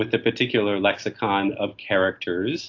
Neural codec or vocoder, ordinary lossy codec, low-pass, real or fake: none; AAC, 48 kbps; 7.2 kHz; real